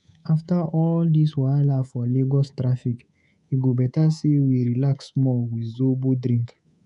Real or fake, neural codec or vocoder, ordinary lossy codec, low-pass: fake; codec, 24 kHz, 3.1 kbps, DualCodec; none; 10.8 kHz